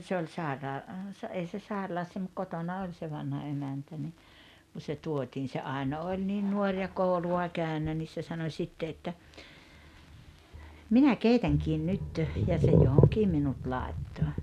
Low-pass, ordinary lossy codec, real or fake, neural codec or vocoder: 14.4 kHz; none; real; none